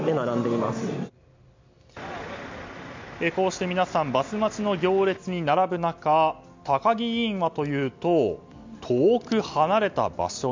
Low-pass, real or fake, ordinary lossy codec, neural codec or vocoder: 7.2 kHz; real; MP3, 64 kbps; none